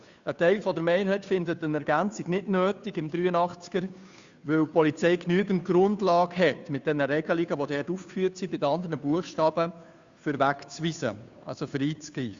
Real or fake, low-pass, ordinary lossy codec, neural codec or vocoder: fake; 7.2 kHz; Opus, 64 kbps; codec, 16 kHz, 2 kbps, FunCodec, trained on Chinese and English, 25 frames a second